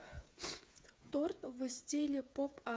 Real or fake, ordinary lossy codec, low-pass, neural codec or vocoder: fake; none; none; codec, 16 kHz, 8 kbps, FreqCodec, smaller model